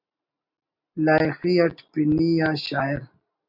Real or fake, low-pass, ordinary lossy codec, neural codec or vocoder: real; 5.4 kHz; MP3, 48 kbps; none